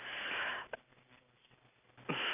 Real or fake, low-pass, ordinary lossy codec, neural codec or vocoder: real; 3.6 kHz; none; none